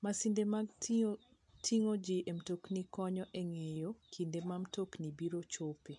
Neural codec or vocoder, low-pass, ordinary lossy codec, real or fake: none; 10.8 kHz; MP3, 64 kbps; real